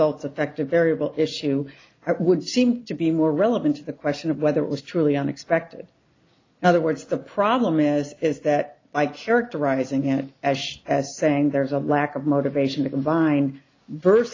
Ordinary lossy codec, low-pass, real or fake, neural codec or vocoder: AAC, 32 kbps; 7.2 kHz; real; none